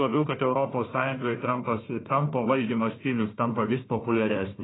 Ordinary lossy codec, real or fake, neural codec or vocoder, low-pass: AAC, 16 kbps; fake; codec, 16 kHz, 1 kbps, FunCodec, trained on Chinese and English, 50 frames a second; 7.2 kHz